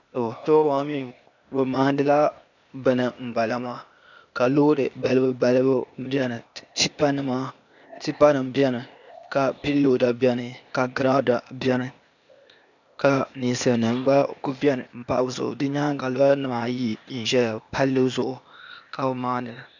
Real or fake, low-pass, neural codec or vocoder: fake; 7.2 kHz; codec, 16 kHz, 0.8 kbps, ZipCodec